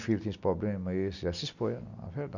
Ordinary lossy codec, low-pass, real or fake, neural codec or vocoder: none; 7.2 kHz; real; none